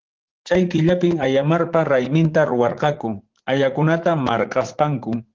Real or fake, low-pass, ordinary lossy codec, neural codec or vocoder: fake; 7.2 kHz; Opus, 16 kbps; vocoder, 44.1 kHz, 80 mel bands, Vocos